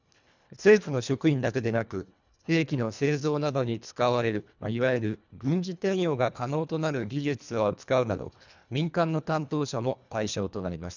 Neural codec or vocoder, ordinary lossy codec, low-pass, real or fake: codec, 24 kHz, 1.5 kbps, HILCodec; none; 7.2 kHz; fake